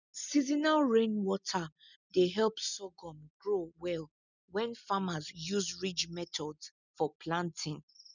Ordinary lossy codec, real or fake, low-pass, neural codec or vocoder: none; real; 7.2 kHz; none